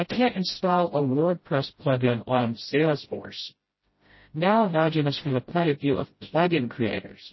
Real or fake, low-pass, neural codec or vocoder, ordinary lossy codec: fake; 7.2 kHz; codec, 16 kHz, 0.5 kbps, FreqCodec, smaller model; MP3, 24 kbps